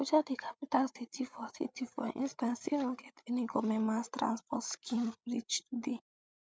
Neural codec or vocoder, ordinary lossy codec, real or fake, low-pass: codec, 16 kHz, 8 kbps, FreqCodec, larger model; none; fake; none